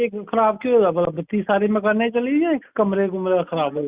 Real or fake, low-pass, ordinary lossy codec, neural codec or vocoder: real; 3.6 kHz; Opus, 64 kbps; none